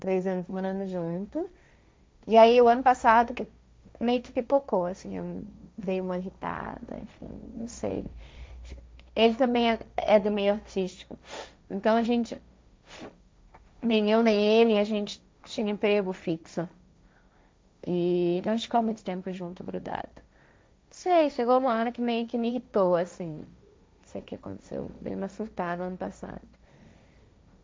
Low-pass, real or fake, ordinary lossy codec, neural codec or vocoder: none; fake; none; codec, 16 kHz, 1.1 kbps, Voila-Tokenizer